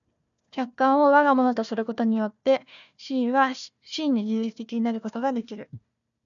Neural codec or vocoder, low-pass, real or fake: codec, 16 kHz, 1 kbps, FunCodec, trained on Chinese and English, 50 frames a second; 7.2 kHz; fake